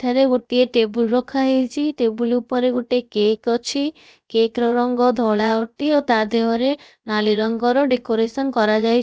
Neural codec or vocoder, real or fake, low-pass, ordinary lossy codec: codec, 16 kHz, 0.7 kbps, FocalCodec; fake; none; none